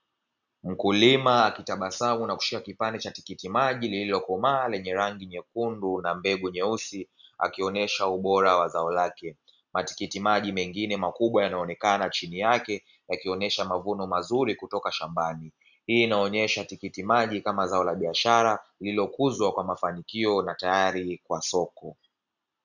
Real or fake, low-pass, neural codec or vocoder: real; 7.2 kHz; none